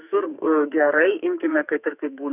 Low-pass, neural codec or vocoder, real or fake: 3.6 kHz; codec, 44.1 kHz, 2.6 kbps, SNAC; fake